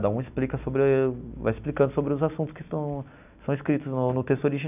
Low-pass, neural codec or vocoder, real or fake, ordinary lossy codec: 3.6 kHz; none; real; none